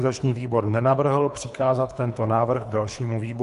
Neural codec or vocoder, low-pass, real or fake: codec, 24 kHz, 3 kbps, HILCodec; 10.8 kHz; fake